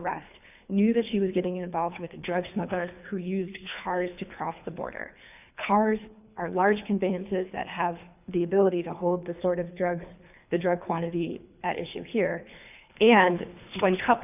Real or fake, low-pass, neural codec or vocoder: fake; 3.6 kHz; codec, 24 kHz, 3 kbps, HILCodec